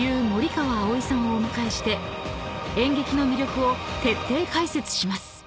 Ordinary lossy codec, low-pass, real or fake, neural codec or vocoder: none; none; real; none